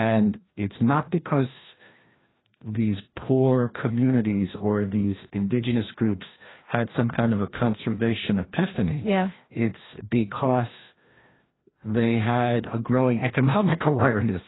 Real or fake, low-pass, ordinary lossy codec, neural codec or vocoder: fake; 7.2 kHz; AAC, 16 kbps; codec, 16 kHz, 1 kbps, FreqCodec, larger model